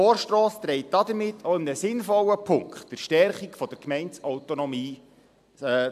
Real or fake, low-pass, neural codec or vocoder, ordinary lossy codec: real; 14.4 kHz; none; MP3, 96 kbps